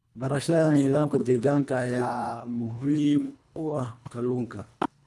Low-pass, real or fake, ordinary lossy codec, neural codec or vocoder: none; fake; none; codec, 24 kHz, 1.5 kbps, HILCodec